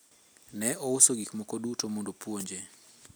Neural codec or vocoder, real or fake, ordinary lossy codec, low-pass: none; real; none; none